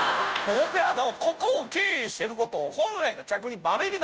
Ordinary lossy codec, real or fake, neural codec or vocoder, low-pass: none; fake; codec, 16 kHz, 0.5 kbps, FunCodec, trained on Chinese and English, 25 frames a second; none